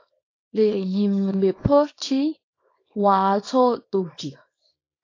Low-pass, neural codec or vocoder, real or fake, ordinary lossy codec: 7.2 kHz; codec, 24 kHz, 0.9 kbps, WavTokenizer, small release; fake; AAC, 32 kbps